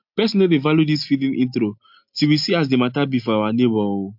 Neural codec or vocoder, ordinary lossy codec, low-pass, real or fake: none; MP3, 48 kbps; 5.4 kHz; real